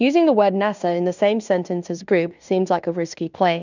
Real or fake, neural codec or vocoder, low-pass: fake; codec, 16 kHz in and 24 kHz out, 0.9 kbps, LongCat-Audio-Codec, fine tuned four codebook decoder; 7.2 kHz